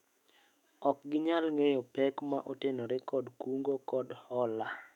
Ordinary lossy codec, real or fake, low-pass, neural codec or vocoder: none; fake; 19.8 kHz; autoencoder, 48 kHz, 128 numbers a frame, DAC-VAE, trained on Japanese speech